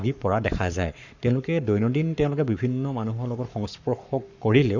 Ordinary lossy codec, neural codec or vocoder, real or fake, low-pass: none; codec, 44.1 kHz, 7.8 kbps, Pupu-Codec; fake; 7.2 kHz